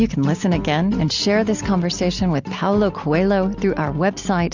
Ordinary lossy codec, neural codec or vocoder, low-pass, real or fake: Opus, 64 kbps; none; 7.2 kHz; real